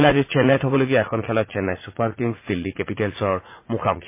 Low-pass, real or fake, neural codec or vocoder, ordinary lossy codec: 3.6 kHz; fake; vocoder, 44.1 kHz, 80 mel bands, Vocos; MP3, 24 kbps